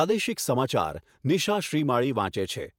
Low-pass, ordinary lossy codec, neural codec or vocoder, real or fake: 19.8 kHz; MP3, 96 kbps; vocoder, 48 kHz, 128 mel bands, Vocos; fake